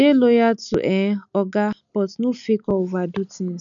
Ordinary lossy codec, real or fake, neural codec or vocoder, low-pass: none; real; none; 7.2 kHz